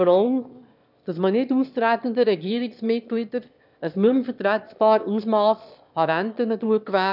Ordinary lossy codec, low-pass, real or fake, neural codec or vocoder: none; 5.4 kHz; fake; autoencoder, 22.05 kHz, a latent of 192 numbers a frame, VITS, trained on one speaker